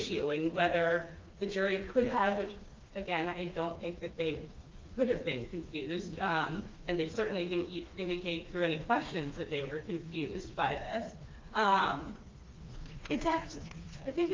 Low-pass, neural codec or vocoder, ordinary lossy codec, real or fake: 7.2 kHz; codec, 16 kHz, 2 kbps, FreqCodec, smaller model; Opus, 24 kbps; fake